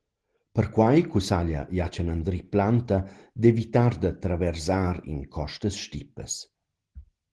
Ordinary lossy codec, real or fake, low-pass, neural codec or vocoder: Opus, 16 kbps; real; 10.8 kHz; none